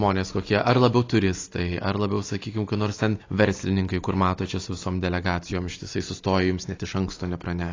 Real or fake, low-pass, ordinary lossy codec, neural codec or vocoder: real; 7.2 kHz; AAC, 32 kbps; none